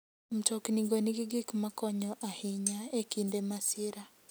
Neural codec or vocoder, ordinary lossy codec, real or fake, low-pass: none; none; real; none